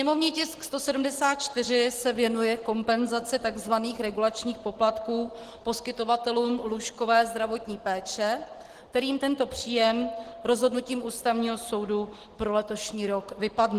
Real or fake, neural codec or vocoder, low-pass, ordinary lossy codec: real; none; 14.4 kHz; Opus, 16 kbps